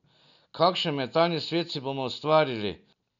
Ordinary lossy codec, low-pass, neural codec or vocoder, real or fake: none; 7.2 kHz; none; real